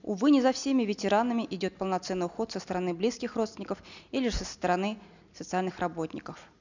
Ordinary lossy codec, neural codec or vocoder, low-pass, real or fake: none; none; 7.2 kHz; real